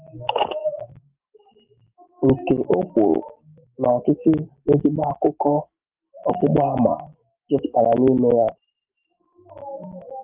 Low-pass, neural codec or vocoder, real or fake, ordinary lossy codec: 3.6 kHz; none; real; Opus, 32 kbps